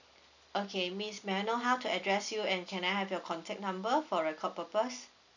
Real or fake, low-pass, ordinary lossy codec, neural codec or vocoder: real; 7.2 kHz; none; none